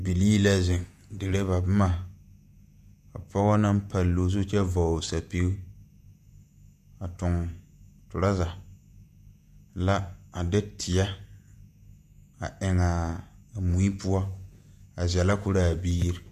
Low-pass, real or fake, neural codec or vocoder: 14.4 kHz; fake; vocoder, 48 kHz, 128 mel bands, Vocos